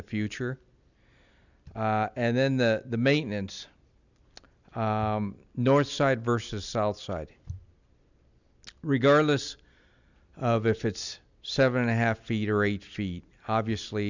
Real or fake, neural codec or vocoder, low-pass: real; none; 7.2 kHz